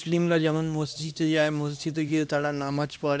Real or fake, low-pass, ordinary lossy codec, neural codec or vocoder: fake; none; none; codec, 16 kHz, 1 kbps, X-Codec, HuBERT features, trained on LibriSpeech